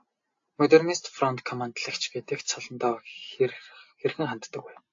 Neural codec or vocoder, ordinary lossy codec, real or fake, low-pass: none; AAC, 48 kbps; real; 7.2 kHz